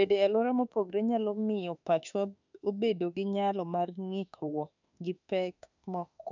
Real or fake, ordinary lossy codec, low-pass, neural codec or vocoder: fake; none; 7.2 kHz; autoencoder, 48 kHz, 32 numbers a frame, DAC-VAE, trained on Japanese speech